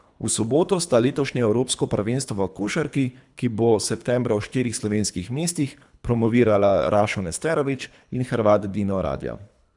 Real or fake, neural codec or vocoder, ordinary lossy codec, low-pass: fake; codec, 24 kHz, 3 kbps, HILCodec; none; 10.8 kHz